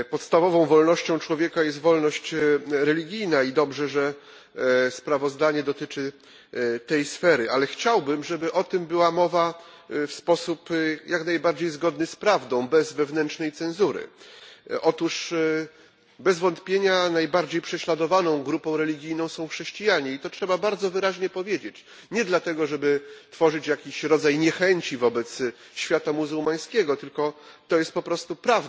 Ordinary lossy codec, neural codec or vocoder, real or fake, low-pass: none; none; real; none